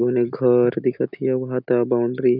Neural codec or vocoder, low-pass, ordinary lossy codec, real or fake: none; 5.4 kHz; none; real